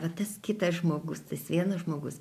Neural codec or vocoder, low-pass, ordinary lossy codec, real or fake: none; 14.4 kHz; AAC, 96 kbps; real